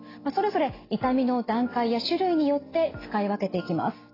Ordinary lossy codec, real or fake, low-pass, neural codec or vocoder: AAC, 24 kbps; real; 5.4 kHz; none